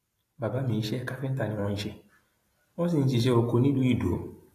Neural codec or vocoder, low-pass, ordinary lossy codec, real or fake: none; 14.4 kHz; AAC, 48 kbps; real